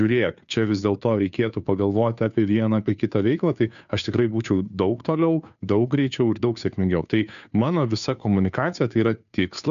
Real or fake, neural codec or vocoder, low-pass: fake; codec, 16 kHz, 2 kbps, FunCodec, trained on Chinese and English, 25 frames a second; 7.2 kHz